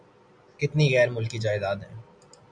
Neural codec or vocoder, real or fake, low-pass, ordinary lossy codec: none; real; 9.9 kHz; MP3, 96 kbps